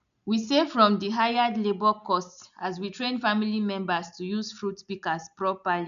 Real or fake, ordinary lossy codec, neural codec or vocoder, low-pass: real; none; none; 7.2 kHz